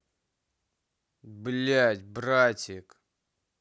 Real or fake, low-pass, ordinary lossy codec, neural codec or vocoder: real; none; none; none